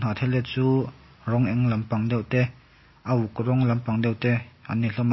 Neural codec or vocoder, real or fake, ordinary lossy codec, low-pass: none; real; MP3, 24 kbps; 7.2 kHz